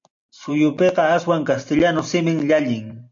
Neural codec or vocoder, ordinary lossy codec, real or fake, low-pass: none; MP3, 48 kbps; real; 7.2 kHz